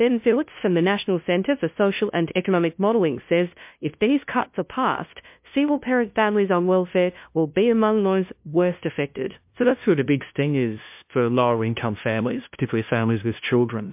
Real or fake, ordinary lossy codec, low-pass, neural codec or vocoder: fake; MP3, 32 kbps; 3.6 kHz; codec, 16 kHz, 0.5 kbps, FunCodec, trained on LibriTTS, 25 frames a second